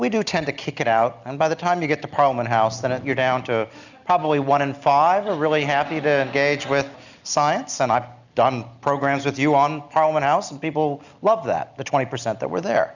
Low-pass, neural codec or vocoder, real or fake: 7.2 kHz; none; real